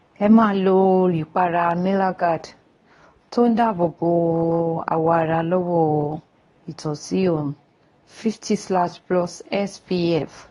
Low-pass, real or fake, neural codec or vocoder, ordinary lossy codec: 10.8 kHz; fake; codec, 24 kHz, 0.9 kbps, WavTokenizer, medium speech release version 2; AAC, 32 kbps